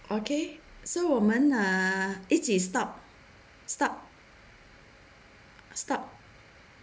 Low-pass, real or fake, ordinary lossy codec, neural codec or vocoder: none; real; none; none